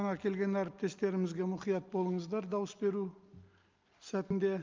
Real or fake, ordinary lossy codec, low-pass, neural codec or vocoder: real; Opus, 24 kbps; 7.2 kHz; none